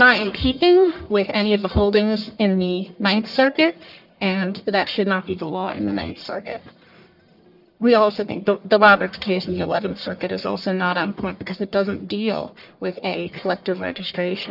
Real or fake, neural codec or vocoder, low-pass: fake; codec, 44.1 kHz, 1.7 kbps, Pupu-Codec; 5.4 kHz